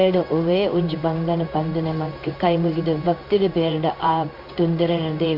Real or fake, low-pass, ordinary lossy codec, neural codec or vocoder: fake; 5.4 kHz; none; codec, 16 kHz in and 24 kHz out, 1 kbps, XY-Tokenizer